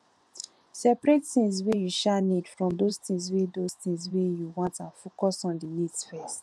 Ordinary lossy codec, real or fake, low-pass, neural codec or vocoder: none; fake; none; vocoder, 24 kHz, 100 mel bands, Vocos